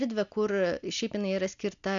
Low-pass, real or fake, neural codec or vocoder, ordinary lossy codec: 7.2 kHz; real; none; MP3, 96 kbps